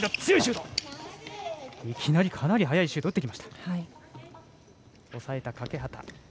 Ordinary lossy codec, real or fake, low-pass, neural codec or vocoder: none; real; none; none